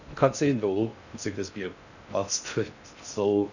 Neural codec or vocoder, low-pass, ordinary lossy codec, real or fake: codec, 16 kHz in and 24 kHz out, 0.6 kbps, FocalCodec, streaming, 2048 codes; 7.2 kHz; none; fake